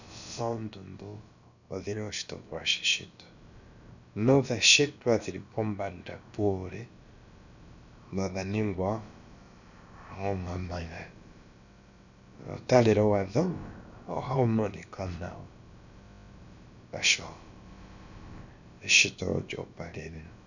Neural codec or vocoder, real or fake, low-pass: codec, 16 kHz, about 1 kbps, DyCAST, with the encoder's durations; fake; 7.2 kHz